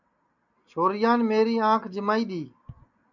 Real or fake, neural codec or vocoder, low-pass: real; none; 7.2 kHz